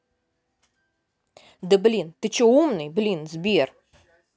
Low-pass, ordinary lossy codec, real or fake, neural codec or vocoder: none; none; real; none